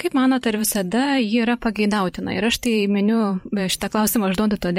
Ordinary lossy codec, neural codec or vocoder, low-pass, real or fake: MP3, 64 kbps; vocoder, 44.1 kHz, 128 mel bands every 512 samples, BigVGAN v2; 19.8 kHz; fake